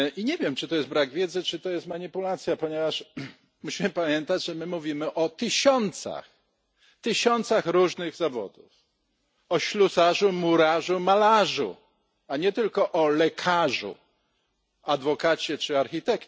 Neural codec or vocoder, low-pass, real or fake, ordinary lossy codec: none; none; real; none